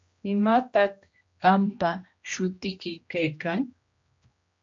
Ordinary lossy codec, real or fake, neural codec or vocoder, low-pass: MP3, 48 kbps; fake; codec, 16 kHz, 1 kbps, X-Codec, HuBERT features, trained on general audio; 7.2 kHz